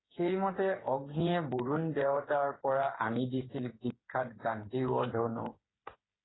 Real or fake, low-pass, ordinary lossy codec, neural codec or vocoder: fake; 7.2 kHz; AAC, 16 kbps; codec, 16 kHz, 8 kbps, FreqCodec, smaller model